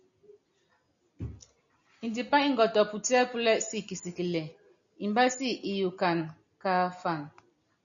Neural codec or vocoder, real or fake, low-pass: none; real; 7.2 kHz